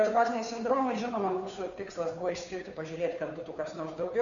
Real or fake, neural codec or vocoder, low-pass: fake; codec, 16 kHz, 2 kbps, FunCodec, trained on Chinese and English, 25 frames a second; 7.2 kHz